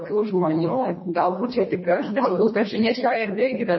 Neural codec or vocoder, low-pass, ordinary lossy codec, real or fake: codec, 24 kHz, 1.5 kbps, HILCodec; 7.2 kHz; MP3, 24 kbps; fake